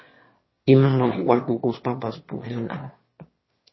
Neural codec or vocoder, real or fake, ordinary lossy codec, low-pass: autoencoder, 22.05 kHz, a latent of 192 numbers a frame, VITS, trained on one speaker; fake; MP3, 24 kbps; 7.2 kHz